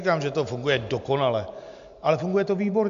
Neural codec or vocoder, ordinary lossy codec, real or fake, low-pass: none; MP3, 64 kbps; real; 7.2 kHz